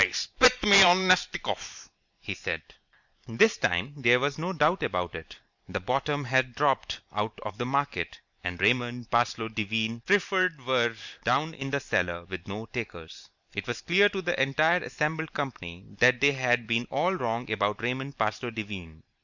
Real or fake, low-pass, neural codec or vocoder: fake; 7.2 kHz; vocoder, 44.1 kHz, 128 mel bands every 512 samples, BigVGAN v2